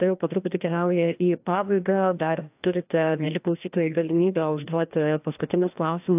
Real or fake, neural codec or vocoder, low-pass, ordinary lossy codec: fake; codec, 16 kHz, 1 kbps, FreqCodec, larger model; 3.6 kHz; AAC, 32 kbps